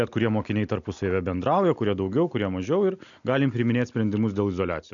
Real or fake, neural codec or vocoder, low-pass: real; none; 7.2 kHz